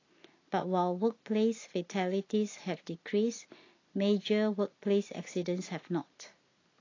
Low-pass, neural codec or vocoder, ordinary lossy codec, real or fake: 7.2 kHz; none; AAC, 32 kbps; real